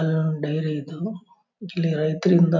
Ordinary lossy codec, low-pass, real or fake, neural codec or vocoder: none; 7.2 kHz; real; none